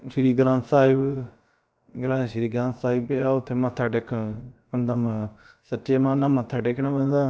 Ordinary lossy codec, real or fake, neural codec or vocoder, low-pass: none; fake; codec, 16 kHz, about 1 kbps, DyCAST, with the encoder's durations; none